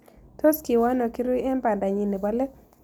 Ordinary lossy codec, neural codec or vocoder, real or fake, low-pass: none; none; real; none